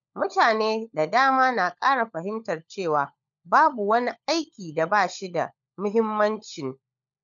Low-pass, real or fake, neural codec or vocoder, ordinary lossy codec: 7.2 kHz; fake; codec, 16 kHz, 16 kbps, FunCodec, trained on LibriTTS, 50 frames a second; none